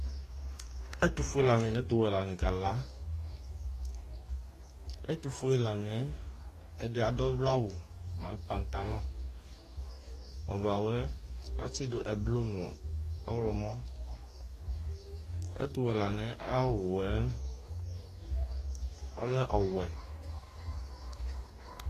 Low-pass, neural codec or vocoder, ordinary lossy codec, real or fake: 14.4 kHz; codec, 44.1 kHz, 2.6 kbps, DAC; AAC, 48 kbps; fake